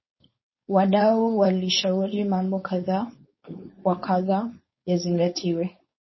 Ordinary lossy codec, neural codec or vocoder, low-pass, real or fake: MP3, 24 kbps; codec, 16 kHz, 4.8 kbps, FACodec; 7.2 kHz; fake